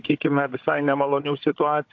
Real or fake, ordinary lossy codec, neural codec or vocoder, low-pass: fake; AAC, 48 kbps; codec, 16 kHz, 16 kbps, FunCodec, trained on Chinese and English, 50 frames a second; 7.2 kHz